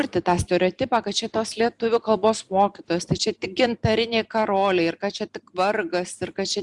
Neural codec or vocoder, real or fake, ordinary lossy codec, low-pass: none; real; Opus, 64 kbps; 10.8 kHz